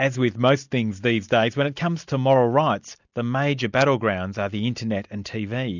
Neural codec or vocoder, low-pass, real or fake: none; 7.2 kHz; real